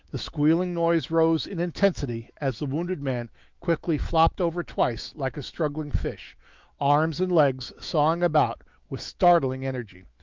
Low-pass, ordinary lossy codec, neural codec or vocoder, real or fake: 7.2 kHz; Opus, 24 kbps; autoencoder, 48 kHz, 128 numbers a frame, DAC-VAE, trained on Japanese speech; fake